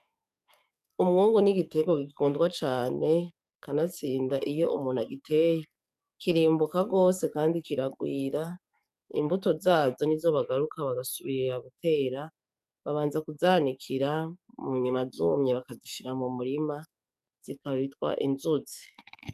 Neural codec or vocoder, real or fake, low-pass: codec, 44.1 kHz, 7.8 kbps, DAC; fake; 14.4 kHz